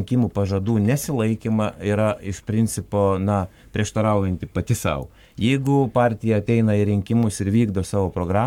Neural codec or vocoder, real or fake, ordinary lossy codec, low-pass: codec, 44.1 kHz, 7.8 kbps, Pupu-Codec; fake; MP3, 96 kbps; 19.8 kHz